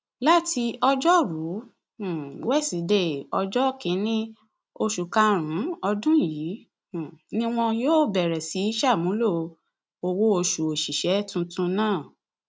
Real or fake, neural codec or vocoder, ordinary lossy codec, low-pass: real; none; none; none